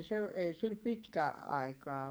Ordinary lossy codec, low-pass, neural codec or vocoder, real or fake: none; none; codec, 44.1 kHz, 2.6 kbps, SNAC; fake